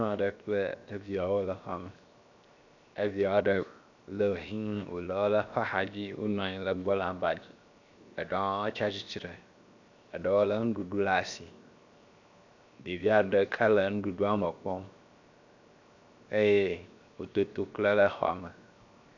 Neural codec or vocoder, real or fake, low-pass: codec, 16 kHz, 0.7 kbps, FocalCodec; fake; 7.2 kHz